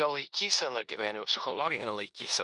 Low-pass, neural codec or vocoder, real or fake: 10.8 kHz; codec, 16 kHz in and 24 kHz out, 0.9 kbps, LongCat-Audio-Codec, four codebook decoder; fake